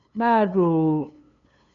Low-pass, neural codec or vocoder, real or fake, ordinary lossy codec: 7.2 kHz; codec, 16 kHz, 2 kbps, FunCodec, trained on LibriTTS, 25 frames a second; fake; MP3, 96 kbps